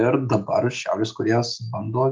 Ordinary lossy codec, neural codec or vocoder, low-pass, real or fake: Opus, 24 kbps; none; 7.2 kHz; real